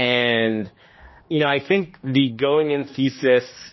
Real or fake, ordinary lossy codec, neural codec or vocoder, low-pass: fake; MP3, 24 kbps; codec, 16 kHz, 2 kbps, X-Codec, HuBERT features, trained on general audio; 7.2 kHz